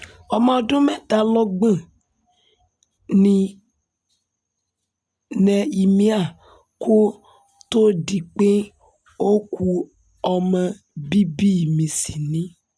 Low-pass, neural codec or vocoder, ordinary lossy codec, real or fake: none; none; none; real